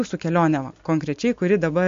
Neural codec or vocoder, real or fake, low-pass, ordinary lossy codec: none; real; 7.2 kHz; MP3, 48 kbps